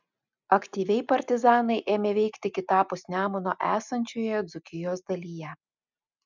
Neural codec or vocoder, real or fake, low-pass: none; real; 7.2 kHz